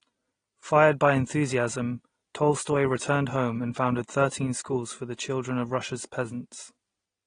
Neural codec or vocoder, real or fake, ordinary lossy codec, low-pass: none; real; AAC, 32 kbps; 9.9 kHz